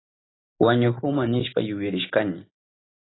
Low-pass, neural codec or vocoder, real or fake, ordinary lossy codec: 7.2 kHz; none; real; AAC, 16 kbps